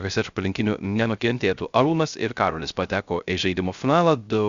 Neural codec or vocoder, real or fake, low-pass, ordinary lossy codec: codec, 16 kHz, 0.3 kbps, FocalCodec; fake; 7.2 kHz; Opus, 64 kbps